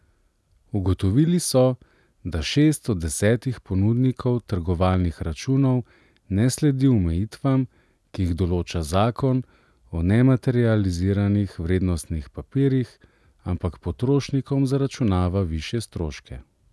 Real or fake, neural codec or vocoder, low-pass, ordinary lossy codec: real; none; none; none